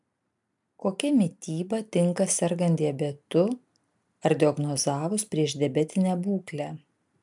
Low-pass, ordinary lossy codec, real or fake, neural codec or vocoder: 10.8 kHz; MP3, 96 kbps; real; none